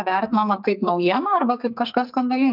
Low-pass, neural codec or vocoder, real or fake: 5.4 kHz; codec, 44.1 kHz, 2.6 kbps, SNAC; fake